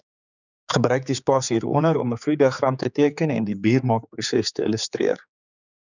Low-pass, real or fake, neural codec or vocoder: 7.2 kHz; fake; codec, 16 kHz, 4 kbps, X-Codec, HuBERT features, trained on general audio